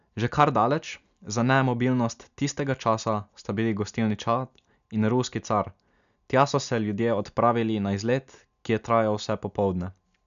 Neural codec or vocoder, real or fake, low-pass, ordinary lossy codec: none; real; 7.2 kHz; none